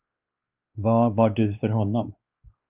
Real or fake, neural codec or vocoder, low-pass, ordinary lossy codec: fake; codec, 16 kHz, 2 kbps, X-Codec, WavLM features, trained on Multilingual LibriSpeech; 3.6 kHz; Opus, 24 kbps